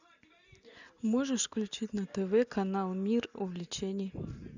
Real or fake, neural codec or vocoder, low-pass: real; none; 7.2 kHz